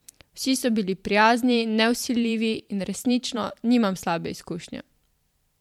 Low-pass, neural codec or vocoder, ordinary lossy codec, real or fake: 19.8 kHz; vocoder, 44.1 kHz, 128 mel bands every 512 samples, BigVGAN v2; MP3, 96 kbps; fake